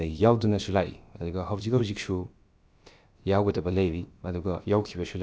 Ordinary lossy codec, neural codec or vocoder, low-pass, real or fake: none; codec, 16 kHz, about 1 kbps, DyCAST, with the encoder's durations; none; fake